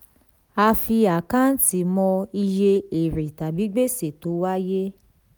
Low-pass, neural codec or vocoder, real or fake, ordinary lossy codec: none; none; real; none